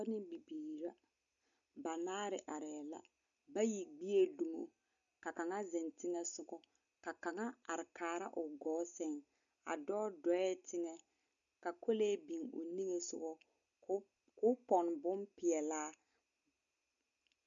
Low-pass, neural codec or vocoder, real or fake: 7.2 kHz; none; real